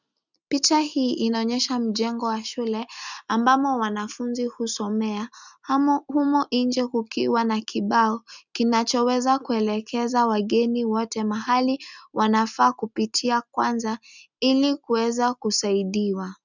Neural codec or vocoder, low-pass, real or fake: none; 7.2 kHz; real